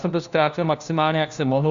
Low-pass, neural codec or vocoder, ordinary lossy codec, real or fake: 7.2 kHz; codec, 16 kHz, 1 kbps, FunCodec, trained on LibriTTS, 50 frames a second; Opus, 64 kbps; fake